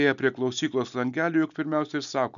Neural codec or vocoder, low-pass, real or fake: none; 7.2 kHz; real